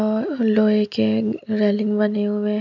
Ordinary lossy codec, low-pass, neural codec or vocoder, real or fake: none; 7.2 kHz; none; real